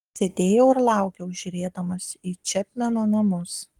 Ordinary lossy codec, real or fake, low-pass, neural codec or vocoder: Opus, 24 kbps; fake; 19.8 kHz; codec, 44.1 kHz, 7.8 kbps, Pupu-Codec